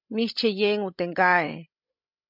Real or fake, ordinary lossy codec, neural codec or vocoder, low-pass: fake; MP3, 48 kbps; codec, 16 kHz, 16 kbps, FreqCodec, larger model; 5.4 kHz